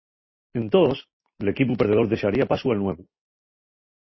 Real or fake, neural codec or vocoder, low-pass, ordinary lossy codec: real; none; 7.2 kHz; MP3, 24 kbps